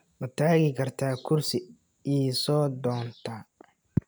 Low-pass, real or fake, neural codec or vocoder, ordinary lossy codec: none; real; none; none